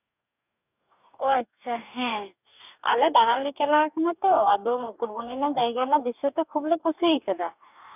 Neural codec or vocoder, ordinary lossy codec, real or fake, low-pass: codec, 44.1 kHz, 2.6 kbps, DAC; none; fake; 3.6 kHz